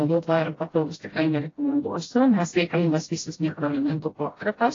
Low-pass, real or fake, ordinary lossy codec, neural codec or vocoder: 7.2 kHz; fake; AAC, 32 kbps; codec, 16 kHz, 0.5 kbps, FreqCodec, smaller model